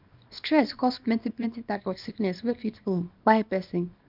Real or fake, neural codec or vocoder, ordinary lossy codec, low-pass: fake; codec, 24 kHz, 0.9 kbps, WavTokenizer, small release; none; 5.4 kHz